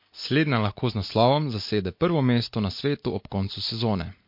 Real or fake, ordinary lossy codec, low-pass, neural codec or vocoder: real; MP3, 32 kbps; 5.4 kHz; none